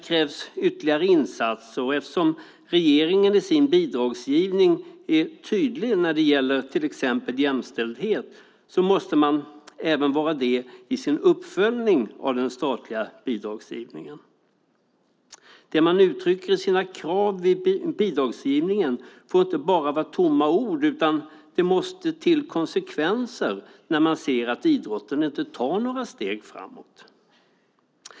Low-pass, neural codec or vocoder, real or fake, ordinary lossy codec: none; none; real; none